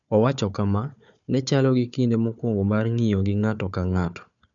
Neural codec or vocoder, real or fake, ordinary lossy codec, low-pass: codec, 16 kHz, 4 kbps, FunCodec, trained on Chinese and English, 50 frames a second; fake; none; 7.2 kHz